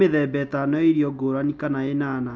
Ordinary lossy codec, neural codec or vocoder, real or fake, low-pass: none; none; real; none